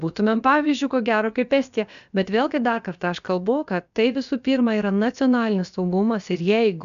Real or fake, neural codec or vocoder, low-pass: fake; codec, 16 kHz, about 1 kbps, DyCAST, with the encoder's durations; 7.2 kHz